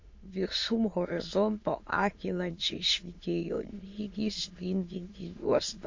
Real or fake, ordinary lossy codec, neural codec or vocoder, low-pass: fake; MP3, 48 kbps; autoencoder, 22.05 kHz, a latent of 192 numbers a frame, VITS, trained on many speakers; 7.2 kHz